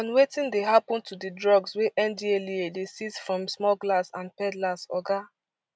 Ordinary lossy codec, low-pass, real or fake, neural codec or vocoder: none; none; real; none